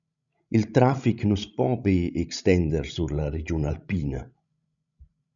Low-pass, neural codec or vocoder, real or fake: 7.2 kHz; codec, 16 kHz, 16 kbps, FreqCodec, larger model; fake